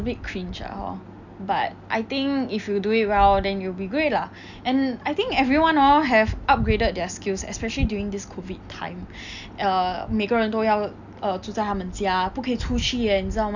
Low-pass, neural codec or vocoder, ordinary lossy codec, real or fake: 7.2 kHz; none; none; real